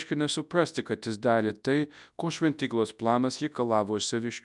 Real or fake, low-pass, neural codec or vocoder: fake; 10.8 kHz; codec, 24 kHz, 0.9 kbps, WavTokenizer, large speech release